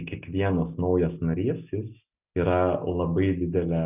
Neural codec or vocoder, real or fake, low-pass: none; real; 3.6 kHz